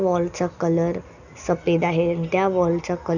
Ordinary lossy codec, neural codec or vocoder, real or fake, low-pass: none; none; real; 7.2 kHz